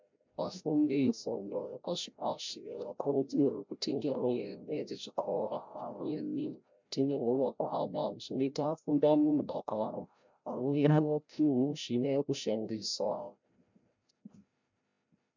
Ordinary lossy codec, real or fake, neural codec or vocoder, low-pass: MP3, 64 kbps; fake; codec, 16 kHz, 0.5 kbps, FreqCodec, larger model; 7.2 kHz